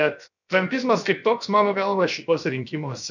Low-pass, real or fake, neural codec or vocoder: 7.2 kHz; fake; codec, 16 kHz, 0.7 kbps, FocalCodec